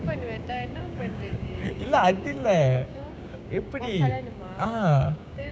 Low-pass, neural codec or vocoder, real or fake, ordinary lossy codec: none; codec, 16 kHz, 6 kbps, DAC; fake; none